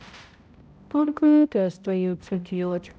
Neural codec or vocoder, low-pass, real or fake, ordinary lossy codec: codec, 16 kHz, 0.5 kbps, X-Codec, HuBERT features, trained on balanced general audio; none; fake; none